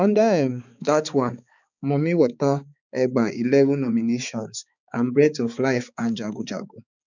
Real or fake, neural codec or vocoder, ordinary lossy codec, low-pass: fake; codec, 16 kHz, 4 kbps, X-Codec, HuBERT features, trained on balanced general audio; none; 7.2 kHz